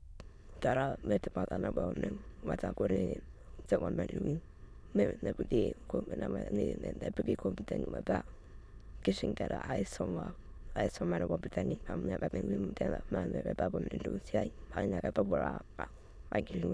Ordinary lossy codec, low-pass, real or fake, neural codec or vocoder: none; none; fake; autoencoder, 22.05 kHz, a latent of 192 numbers a frame, VITS, trained on many speakers